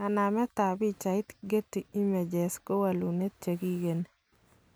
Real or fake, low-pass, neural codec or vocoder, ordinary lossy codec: real; none; none; none